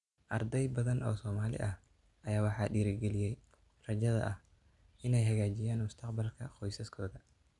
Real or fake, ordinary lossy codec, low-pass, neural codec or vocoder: real; none; 10.8 kHz; none